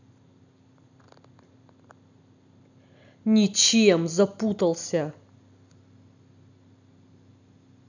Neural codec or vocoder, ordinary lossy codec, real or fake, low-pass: none; none; real; 7.2 kHz